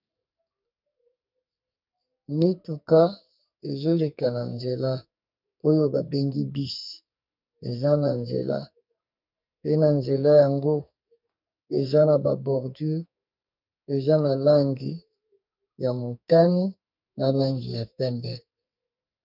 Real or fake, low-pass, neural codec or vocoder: fake; 5.4 kHz; codec, 44.1 kHz, 2.6 kbps, SNAC